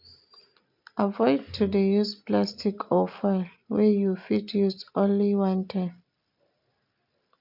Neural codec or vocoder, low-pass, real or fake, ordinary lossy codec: none; 5.4 kHz; real; none